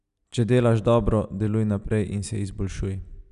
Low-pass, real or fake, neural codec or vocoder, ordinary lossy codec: 10.8 kHz; real; none; none